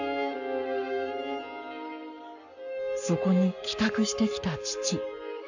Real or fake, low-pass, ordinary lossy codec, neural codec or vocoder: fake; 7.2 kHz; none; codec, 44.1 kHz, 7.8 kbps, Pupu-Codec